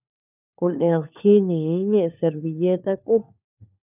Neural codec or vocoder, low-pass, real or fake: codec, 16 kHz, 4 kbps, FunCodec, trained on LibriTTS, 50 frames a second; 3.6 kHz; fake